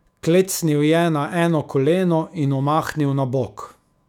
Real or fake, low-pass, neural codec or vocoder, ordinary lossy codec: fake; 19.8 kHz; autoencoder, 48 kHz, 128 numbers a frame, DAC-VAE, trained on Japanese speech; none